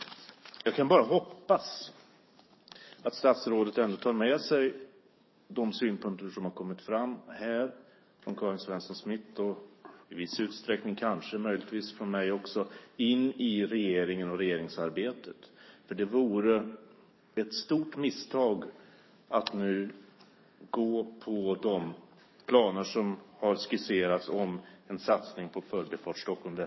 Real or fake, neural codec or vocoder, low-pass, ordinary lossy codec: fake; codec, 44.1 kHz, 7.8 kbps, DAC; 7.2 kHz; MP3, 24 kbps